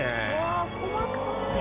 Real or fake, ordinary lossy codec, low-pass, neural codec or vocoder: real; Opus, 64 kbps; 3.6 kHz; none